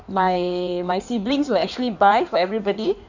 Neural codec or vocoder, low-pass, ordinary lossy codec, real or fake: codec, 16 kHz in and 24 kHz out, 1.1 kbps, FireRedTTS-2 codec; 7.2 kHz; none; fake